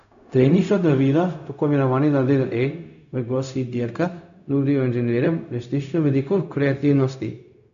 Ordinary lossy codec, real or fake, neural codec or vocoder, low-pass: none; fake; codec, 16 kHz, 0.4 kbps, LongCat-Audio-Codec; 7.2 kHz